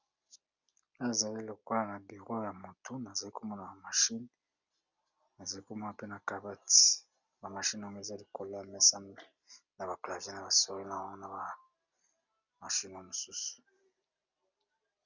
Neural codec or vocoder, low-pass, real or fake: none; 7.2 kHz; real